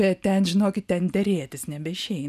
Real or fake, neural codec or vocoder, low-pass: real; none; 14.4 kHz